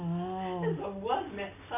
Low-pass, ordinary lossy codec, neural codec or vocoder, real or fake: 3.6 kHz; none; none; real